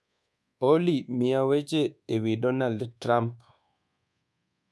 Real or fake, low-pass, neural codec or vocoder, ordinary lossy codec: fake; none; codec, 24 kHz, 1.2 kbps, DualCodec; none